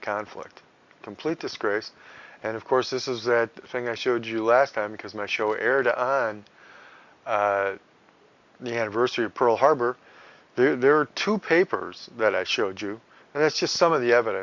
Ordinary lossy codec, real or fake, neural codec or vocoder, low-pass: Opus, 64 kbps; real; none; 7.2 kHz